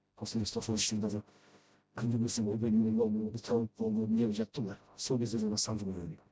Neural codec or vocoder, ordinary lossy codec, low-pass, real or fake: codec, 16 kHz, 0.5 kbps, FreqCodec, smaller model; none; none; fake